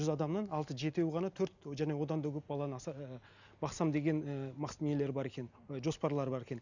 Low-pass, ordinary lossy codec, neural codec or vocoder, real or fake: 7.2 kHz; none; none; real